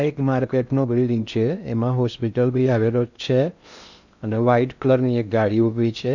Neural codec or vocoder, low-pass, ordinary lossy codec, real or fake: codec, 16 kHz in and 24 kHz out, 0.6 kbps, FocalCodec, streaming, 2048 codes; 7.2 kHz; none; fake